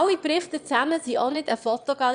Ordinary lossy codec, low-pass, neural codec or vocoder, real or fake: none; 9.9 kHz; autoencoder, 22.05 kHz, a latent of 192 numbers a frame, VITS, trained on one speaker; fake